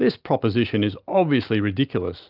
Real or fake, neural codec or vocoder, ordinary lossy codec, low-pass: real; none; Opus, 32 kbps; 5.4 kHz